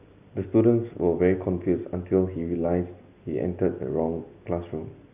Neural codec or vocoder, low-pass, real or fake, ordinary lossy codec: none; 3.6 kHz; real; none